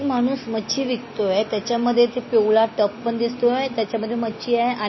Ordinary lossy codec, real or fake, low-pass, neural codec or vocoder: MP3, 24 kbps; real; 7.2 kHz; none